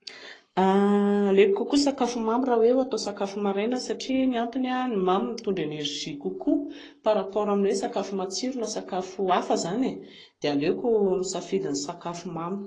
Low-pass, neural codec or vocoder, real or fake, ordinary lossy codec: 9.9 kHz; codec, 44.1 kHz, 7.8 kbps, Pupu-Codec; fake; AAC, 32 kbps